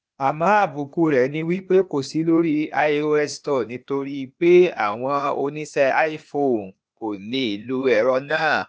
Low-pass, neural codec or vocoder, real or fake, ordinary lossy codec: none; codec, 16 kHz, 0.8 kbps, ZipCodec; fake; none